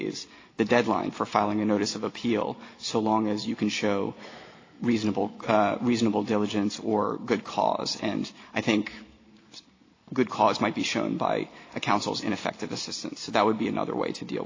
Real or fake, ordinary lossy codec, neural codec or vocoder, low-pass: real; AAC, 32 kbps; none; 7.2 kHz